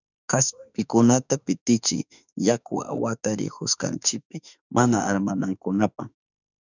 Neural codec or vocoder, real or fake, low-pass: autoencoder, 48 kHz, 32 numbers a frame, DAC-VAE, trained on Japanese speech; fake; 7.2 kHz